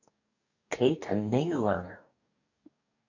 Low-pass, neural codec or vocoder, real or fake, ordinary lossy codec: 7.2 kHz; codec, 44.1 kHz, 2.6 kbps, DAC; fake; AAC, 48 kbps